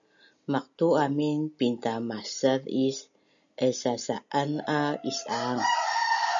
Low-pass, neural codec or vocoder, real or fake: 7.2 kHz; none; real